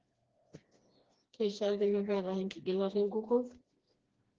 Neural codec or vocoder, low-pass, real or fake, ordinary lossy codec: codec, 16 kHz, 2 kbps, FreqCodec, smaller model; 7.2 kHz; fake; Opus, 16 kbps